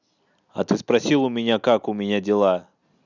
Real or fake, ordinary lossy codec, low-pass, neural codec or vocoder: real; none; 7.2 kHz; none